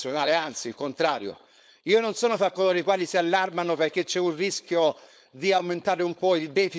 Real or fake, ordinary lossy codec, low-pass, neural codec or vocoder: fake; none; none; codec, 16 kHz, 4.8 kbps, FACodec